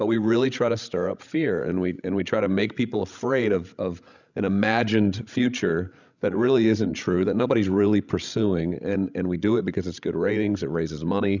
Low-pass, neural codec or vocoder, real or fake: 7.2 kHz; codec, 16 kHz, 16 kbps, FreqCodec, larger model; fake